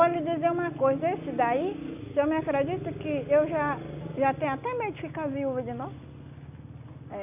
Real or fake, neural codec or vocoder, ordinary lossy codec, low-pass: real; none; none; 3.6 kHz